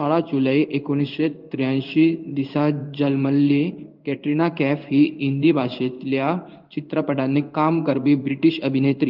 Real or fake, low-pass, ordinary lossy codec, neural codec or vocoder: fake; 5.4 kHz; Opus, 32 kbps; codec, 16 kHz in and 24 kHz out, 1 kbps, XY-Tokenizer